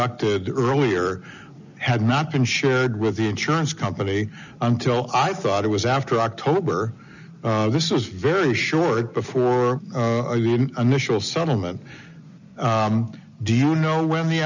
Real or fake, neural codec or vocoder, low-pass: real; none; 7.2 kHz